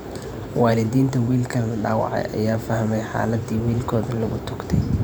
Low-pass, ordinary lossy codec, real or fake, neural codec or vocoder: none; none; fake; vocoder, 44.1 kHz, 128 mel bands every 512 samples, BigVGAN v2